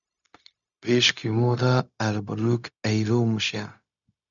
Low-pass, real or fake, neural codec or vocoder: 7.2 kHz; fake; codec, 16 kHz, 0.4 kbps, LongCat-Audio-Codec